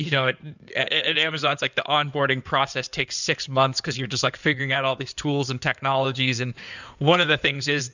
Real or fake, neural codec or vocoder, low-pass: fake; codec, 16 kHz in and 24 kHz out, 2.2 kbps, FireRedTTS-2 codec; 7.2 kHz